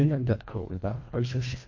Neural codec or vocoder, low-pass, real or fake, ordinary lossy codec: codec, 24 kHz, 1.5 kbps, HILCodec; 7.2 kHz; fake; MP3, 48 kbps